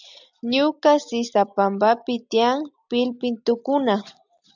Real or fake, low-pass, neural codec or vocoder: real; 7.2 kHz; none